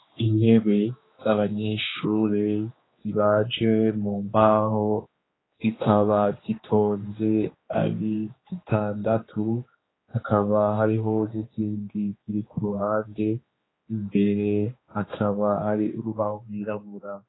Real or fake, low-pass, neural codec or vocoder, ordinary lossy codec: fake; 7.2 kHz; codec, 16 kHz, 2 kbps, X-Codec, HuBERT features, trained on general audio; AAC, 16 kbps